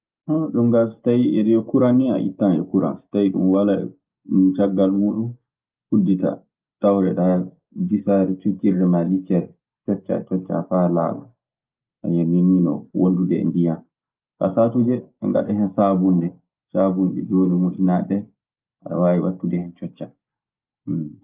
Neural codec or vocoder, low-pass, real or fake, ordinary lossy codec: none; 3.6 kHz; real; Opus, 24 kbps